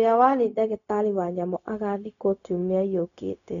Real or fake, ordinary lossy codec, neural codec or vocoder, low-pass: fake; none; codec, 16 kHz, 0.4 kbps, LongCat-Audio-Codec; 7.2 kHz